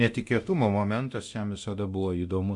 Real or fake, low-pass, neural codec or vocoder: fake; 10.8 kHz; codec, 24 kHz, 0.9 kbps, DualCodec